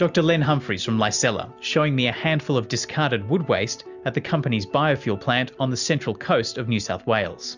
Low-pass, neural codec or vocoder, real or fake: 7.2 kHz; none; real